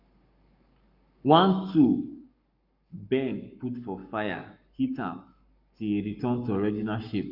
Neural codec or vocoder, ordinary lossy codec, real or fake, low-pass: codec, 44.1 kHz, 7.8 kbps, Pupu-Codec; none; fake; 5.4 kHz